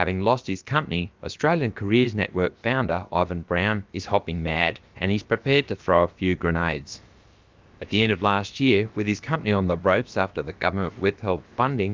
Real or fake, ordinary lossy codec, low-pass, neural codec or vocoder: fake; Opus, 24 kbps; 7.2 kHz; codec, 16 kHz, about 1 kbps, DyCAST, with the encoder's durations